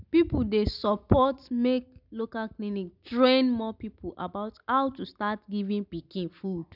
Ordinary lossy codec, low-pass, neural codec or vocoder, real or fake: none; 5.4 kHz; none; real